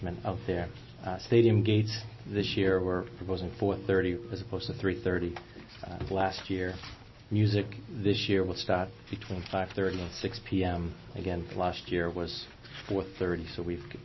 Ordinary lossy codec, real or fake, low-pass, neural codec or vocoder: MP3, 24 kbps; real; 7.2 kHz; none